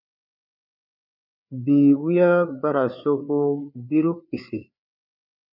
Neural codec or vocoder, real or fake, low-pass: codec, 16 kHz, 4 kbps, FreqCodec, larger model; fake; 5.4 kHz